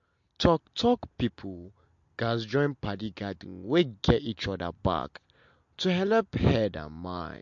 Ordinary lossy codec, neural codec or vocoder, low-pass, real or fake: MP3, 48 kbps; none; 7.2 kHz; real